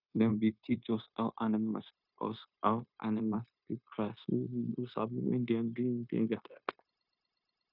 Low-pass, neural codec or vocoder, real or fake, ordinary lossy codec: 5.4 kHz; codec, 16 kHz, 0.9 kbps, LongCat-Audio-Codec; fake; none